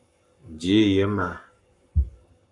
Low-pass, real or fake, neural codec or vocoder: 10.8 kHz; fake; codec, 44.1 kHz, 7.8 kbps, Pupu-Codec